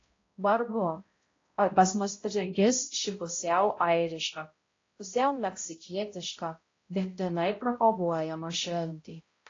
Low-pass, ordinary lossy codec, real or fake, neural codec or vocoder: 7.2 kHz; AAC, 32 kbps; fake; codec, 16 kHz, 0.5 kbps, X-Codec, HuBERT features, trained on balanced general audio